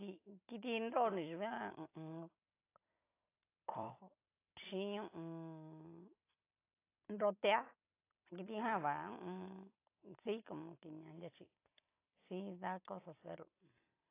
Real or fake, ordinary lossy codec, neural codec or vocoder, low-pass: fake; AAC, 24 kbps; vocoder, 44.1 kHz, 128 mel bands every 256 samples, BigVGAN v2; 3.6 kHz